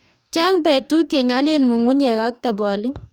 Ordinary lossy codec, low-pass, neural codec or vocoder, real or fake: none; 19.8 kHz; codec, 44.1 kHz, 2.6 kbps, DAC; fake